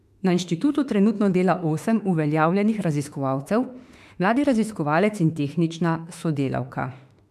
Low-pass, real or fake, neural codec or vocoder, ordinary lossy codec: 14.4 kHz; fake; autoencoder, 48 kHz, 32 numbers a frame, DAC-VAE, trained on Japanese speech; MP3, 96 kbps